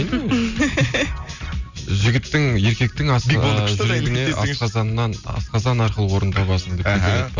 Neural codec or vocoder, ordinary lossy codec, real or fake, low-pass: none; Opus, 64 kbps; real; 7.2 kHz